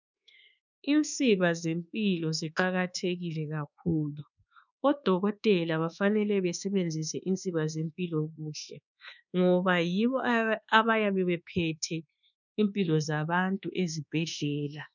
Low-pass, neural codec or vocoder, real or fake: 7.2 kHz; codec, 24 kHz, 1.2 kbps, DualCodec; fake